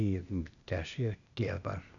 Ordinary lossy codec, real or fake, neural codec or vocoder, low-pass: none; fake; codec, 16 kHz, 0.8 kbps, ZipCodec; 7.2 kHz